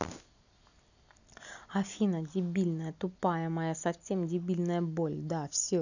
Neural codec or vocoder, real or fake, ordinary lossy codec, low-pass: none; real; none; 7.2 kHz